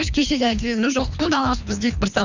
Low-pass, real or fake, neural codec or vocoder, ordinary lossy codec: 7.2 kHz; fake; codec, 24 kHz, 3 kbps, HILCodec; none